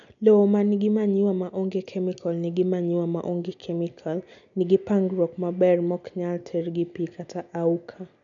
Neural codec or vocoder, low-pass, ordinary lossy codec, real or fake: none; 7.2 kHz; none; real